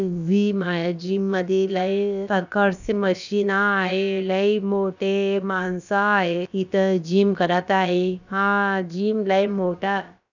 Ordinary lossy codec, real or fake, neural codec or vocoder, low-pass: none; fake; codec, 16 kHz, about 1 kbps, DyCAST, with the encoder's durations; 7.2 kHz